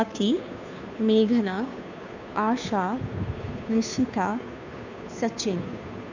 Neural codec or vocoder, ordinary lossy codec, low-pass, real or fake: codec, 16 kHz, 2 kbps, FunCodec, trained on Chinese and English, 25 frames a second; none; 7.2 kHz; fake